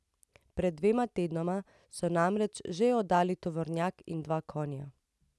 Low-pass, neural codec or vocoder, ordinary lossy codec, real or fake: none; none; none; real